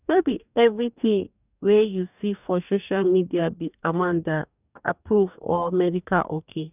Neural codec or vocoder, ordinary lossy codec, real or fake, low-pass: codec, 44.1 kHz, 2.6 kbps, DAC; none; fake; 3.6 kHz